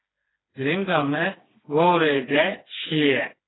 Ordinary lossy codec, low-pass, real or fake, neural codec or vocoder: AAC, 16 kbps; 7.2 kHz; fake; codec, 16 kHz, 2 kbps, FreqCodec, smaller model